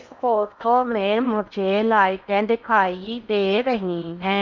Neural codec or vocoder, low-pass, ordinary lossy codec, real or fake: codec, 16 kHz in and 24 kHz out, 0.6 kbps, FocalCodec, streaming, 4096 codes; 7.2 kHz; none; fake